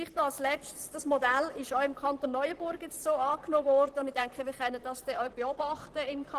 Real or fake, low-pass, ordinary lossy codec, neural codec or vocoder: fake; 14.4 kHz; Opus, 16 kbps; vocoder, 44.1 kHz, 128 mel bands, Pupu-Vocoder